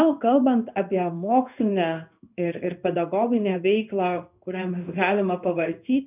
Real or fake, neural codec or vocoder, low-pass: fake; codec, 16 kHz in and 24 kHz out, 1 kbps, XY-Tokenizer; 3.6 kHz